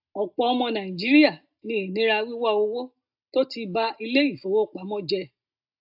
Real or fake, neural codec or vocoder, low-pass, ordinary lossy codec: real; none; 5.4 kHz; AAC, 48 kbps